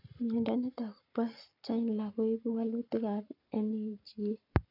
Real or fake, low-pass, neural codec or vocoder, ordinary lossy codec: fake; 5.4 kHz; codec, 44.1 kHz, 7.8 kbps, Pupu-Codec; none